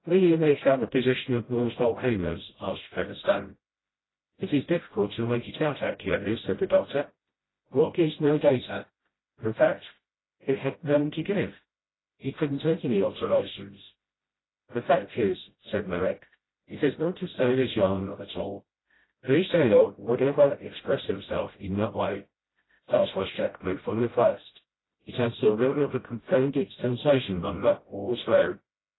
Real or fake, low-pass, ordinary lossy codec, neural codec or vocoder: fake; 7.2 kHz; AAC, 16 kbps; codec, 16 kHz, 0.5 kbps, FreqCodec, smaller model